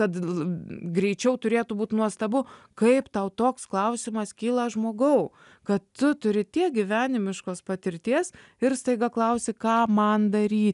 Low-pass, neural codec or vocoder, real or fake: 10.8 kHz; none; real